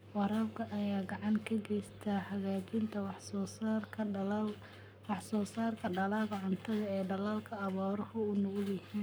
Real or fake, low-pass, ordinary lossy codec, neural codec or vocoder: fake; none; none; codec, 44.1 kHz, 7.8 kbps, Pupu-Codec